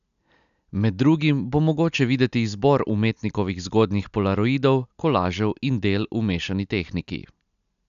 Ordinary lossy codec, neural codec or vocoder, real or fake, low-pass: none; none; real; 7.2 kHz